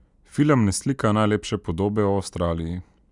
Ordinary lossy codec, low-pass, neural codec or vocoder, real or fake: none; 10.8 kHz; none; real